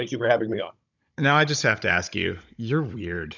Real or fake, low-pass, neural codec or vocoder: fake; 7.2 kHz; codec, 16 kHz, 16 kbps, FunCodec, trained on Chinese and English, 50 frames a second